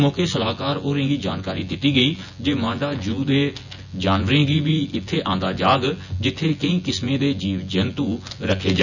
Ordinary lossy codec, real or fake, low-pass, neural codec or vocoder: none; fake; 7.2 kHz; vocoder, 24 kHz, 100 mel bands, Vocos